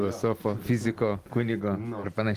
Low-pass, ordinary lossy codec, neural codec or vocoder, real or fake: 14.4 kHz; Opus, 24 kbps; none; real